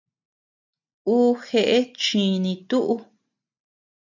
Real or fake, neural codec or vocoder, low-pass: real; none; 7.2 kHz